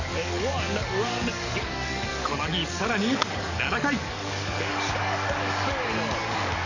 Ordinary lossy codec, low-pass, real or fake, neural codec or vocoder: none; 7.2 kHz; fake; codec, 44.1 kHz, 7.8 kbps, DAC